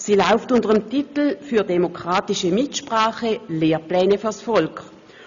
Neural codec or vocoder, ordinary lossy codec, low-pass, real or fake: none; none; 7.2 kHz; real